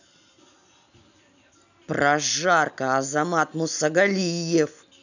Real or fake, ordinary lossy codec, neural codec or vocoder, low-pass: real; none; none; 7.2 kHz